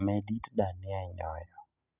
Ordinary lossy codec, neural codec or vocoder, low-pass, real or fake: none; none; 3.6 kHz; real